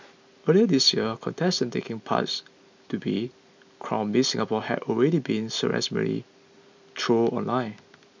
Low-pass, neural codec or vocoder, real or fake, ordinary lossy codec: 7.2 kHz; none; real; MP3, 64 kbps